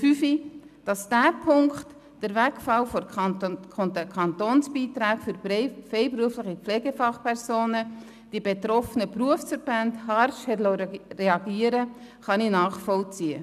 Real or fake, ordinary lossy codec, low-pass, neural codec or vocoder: real; none; 14.4 kHz; none